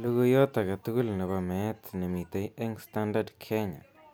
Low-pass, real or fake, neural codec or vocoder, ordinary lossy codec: none; real; none; none